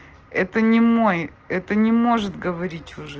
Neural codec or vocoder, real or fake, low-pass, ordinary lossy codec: none; real; 7.2 kHz; Opus, 16 kbps